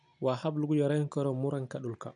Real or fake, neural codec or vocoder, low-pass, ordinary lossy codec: real; none; 9.9 kHz; none